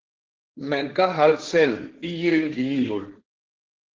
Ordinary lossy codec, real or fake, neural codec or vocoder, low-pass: Opus, 16 kbps; fake; codec, 16 kHz, 1.1 kbps, Voila-Tokenizer; 7.2 kHz